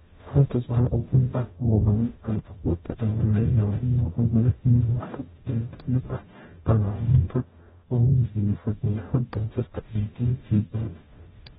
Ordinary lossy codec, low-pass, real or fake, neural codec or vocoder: AAC, 16 kbps; 19.8 kHz; fake; codec, 44.1 kHz, 0.9 kbps, DAC